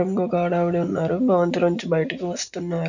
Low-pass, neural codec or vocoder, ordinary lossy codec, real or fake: 7.2 kHz; none; none; real